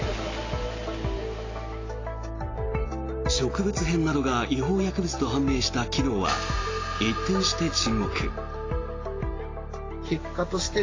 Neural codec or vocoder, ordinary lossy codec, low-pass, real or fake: none; AAC, 32 kbps; 7.2 kHz; real